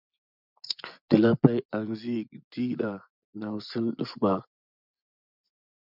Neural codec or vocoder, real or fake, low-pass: vocoder, 24 kHz, 100 mel bands, Vocos; fake; 5.4 kHz